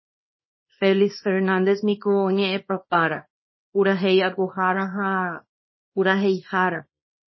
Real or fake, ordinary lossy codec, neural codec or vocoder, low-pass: fake; MP3, 24 kbps; codec, 24 kHz, 0.9 kbps, WavTokenizer, small release; 7.2 kHz